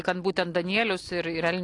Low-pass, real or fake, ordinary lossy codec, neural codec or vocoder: 10.8 kHz; real; AAC, 48 kbps; none